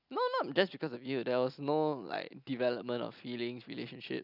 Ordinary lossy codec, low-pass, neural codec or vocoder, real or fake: none; 5.4 kHz; none; real